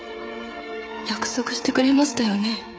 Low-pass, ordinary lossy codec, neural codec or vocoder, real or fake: none; none; codec, 16 kHz, 16 kbps, FreqCodec, smaller model; fake